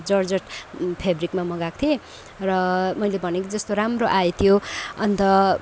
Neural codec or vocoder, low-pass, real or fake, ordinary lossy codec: none; none; real; none